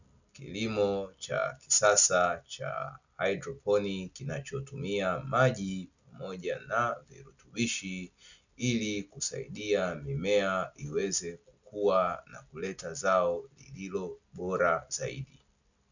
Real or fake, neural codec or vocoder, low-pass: real; none; 7.2 kHz